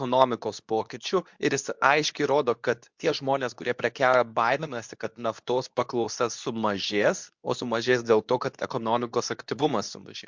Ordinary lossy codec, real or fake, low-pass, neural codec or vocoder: MP3, 64 kbps; fake; 7.2 kHz; codec, 24 kHz, 0.9 kbps, WavTokenizer, medium speech release version 2